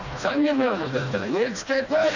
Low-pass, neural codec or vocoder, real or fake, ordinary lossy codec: 7.2 kHz; codec, 16 kHz, 1 kbps, FreqCodec, smaller model; fake; none